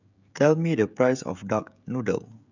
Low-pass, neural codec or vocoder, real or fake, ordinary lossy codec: 7.2 kHz; codec, 16 kHz, 16 kbps, FreqCodec, smaller model; fake; none